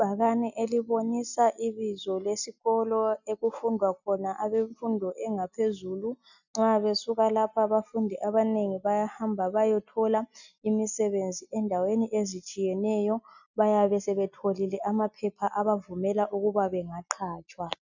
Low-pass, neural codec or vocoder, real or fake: 7.2 kHz; none; real